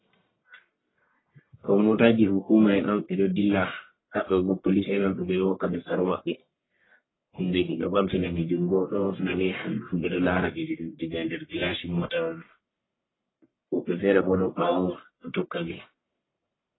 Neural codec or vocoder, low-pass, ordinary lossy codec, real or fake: codec, 44.1 kHz, 1.7 kbps, Pupu-Codec; 7.2 kHz; AAC, 16 kbps; fake